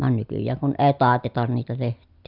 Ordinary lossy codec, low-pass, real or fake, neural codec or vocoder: none; 5.4 kHz; real; none